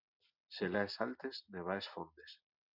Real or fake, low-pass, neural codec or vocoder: real; 5.4 kHz; none